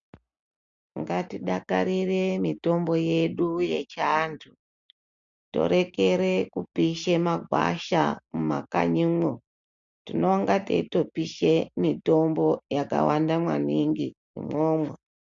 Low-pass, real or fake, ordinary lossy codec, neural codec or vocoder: 7.2 kHz; real; MP3, 64 kbps; none